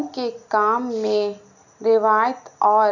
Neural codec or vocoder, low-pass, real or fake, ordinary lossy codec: none; 7.2 kHz; real; none